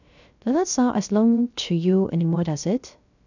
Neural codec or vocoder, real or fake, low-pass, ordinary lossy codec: codec, 16 kHz, 0.3 kbps, FocalCodec; fake; 7.2 kHz; none